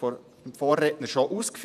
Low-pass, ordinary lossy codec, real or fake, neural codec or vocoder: 14.4 kHz; none; fake; vocoder, 48 kHz, 128 mel bands, Vocos